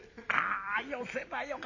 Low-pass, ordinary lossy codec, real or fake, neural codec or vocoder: 7.2 kHz; none; fake; vocoder, 44.1 kHz, 128 mel bands every 256 samples, BigVGAN v2